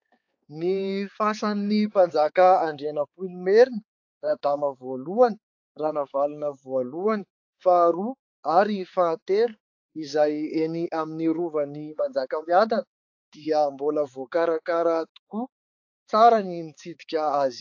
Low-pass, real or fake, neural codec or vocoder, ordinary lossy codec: 7.2 kHz; fake; codec, 16 kHz, 4 kbps, X-Codec, HuBERT features, trained on balanced general audio; AAC, 48 kbps